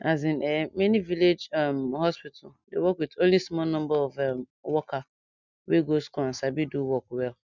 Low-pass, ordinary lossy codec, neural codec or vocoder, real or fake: 7.2 kHz; none; none; real